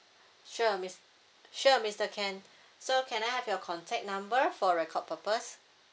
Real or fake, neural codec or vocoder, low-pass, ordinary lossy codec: real; none; none; none